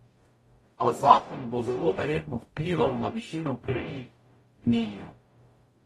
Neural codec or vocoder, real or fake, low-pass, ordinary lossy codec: codec, 44.1 kHz, 0.9 kbps, DAC; fake; 19.8 kHz; AAC, 32 kbps